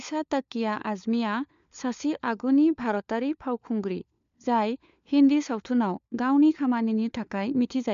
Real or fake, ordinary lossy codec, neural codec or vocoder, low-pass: fake; MP3, 64 kbps; codec, 16 kHz, 4 kbps, FunCodec, trained on LibriTTS, 50 frames a second; 7.2 kHz